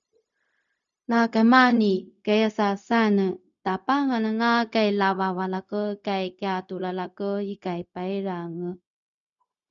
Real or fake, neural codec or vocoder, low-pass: fake; codec, 16 kHz, 0.4 kbps, LongCat-Audio-Codec; 7.2 kHz